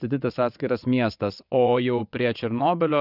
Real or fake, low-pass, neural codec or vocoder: fake; 5.4 kHz; vocoder, 22.05 kHz, 80 mel bands, WaveNeXt